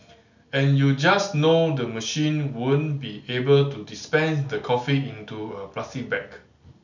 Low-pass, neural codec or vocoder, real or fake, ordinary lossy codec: 7.2 kHz; none; real; none